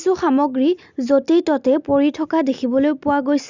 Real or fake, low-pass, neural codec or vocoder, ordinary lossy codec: real; 7.2 kHz; none; Opus, 64 kbps